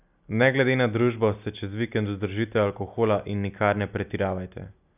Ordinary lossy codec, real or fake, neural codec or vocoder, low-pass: none; real; none; 3.6 kHz